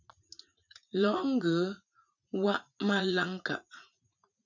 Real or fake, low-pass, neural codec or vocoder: fake; 7.2 kHz; vocoder, 44.1 kHz, 80 mel bands, Vocos